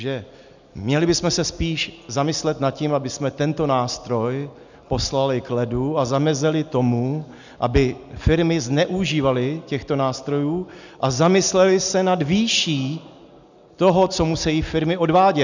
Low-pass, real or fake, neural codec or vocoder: 7.2 kHz; real; none